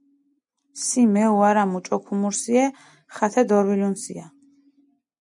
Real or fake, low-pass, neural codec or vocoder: real; 10.8 kHz; none